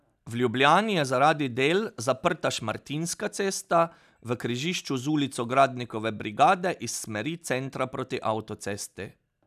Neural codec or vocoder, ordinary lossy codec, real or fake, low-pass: none; none; real; 14.4 kHz